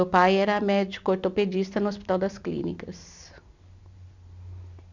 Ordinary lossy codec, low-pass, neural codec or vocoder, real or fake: none; 7.2 kHz; none; real